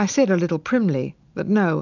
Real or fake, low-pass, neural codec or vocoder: real; 7.2 kHz; none